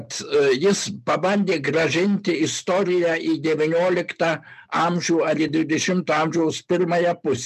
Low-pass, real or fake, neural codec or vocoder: 14.4 kHz; real; none